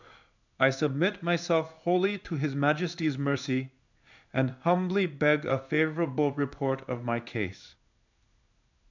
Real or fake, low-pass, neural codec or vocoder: fake; 7.2 kHz; codec, 16 kHz in and 24 kHz out, 1 kbps, XY-Tokenizer